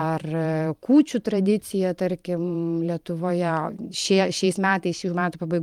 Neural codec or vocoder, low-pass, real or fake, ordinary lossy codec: vocoder, 44.1 kHz, 128 mel bands every 512 samples, BigVGAN v2; 19.8 kHz; fake; Opus, 32 kbps